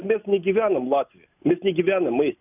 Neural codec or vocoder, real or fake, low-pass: none; real; 3.6 kHz